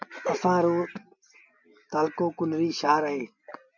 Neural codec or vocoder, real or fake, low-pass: none; real; 7.2 kHz